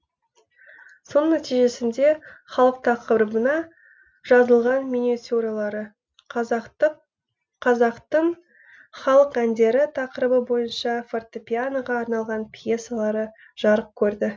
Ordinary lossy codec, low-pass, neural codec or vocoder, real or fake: none; none; none; real